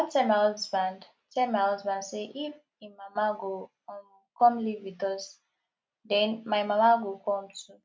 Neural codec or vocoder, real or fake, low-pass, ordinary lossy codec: none; real; none; none